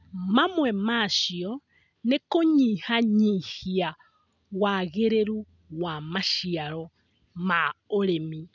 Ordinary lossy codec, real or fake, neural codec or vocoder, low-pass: none; real; none; 7.2 kHz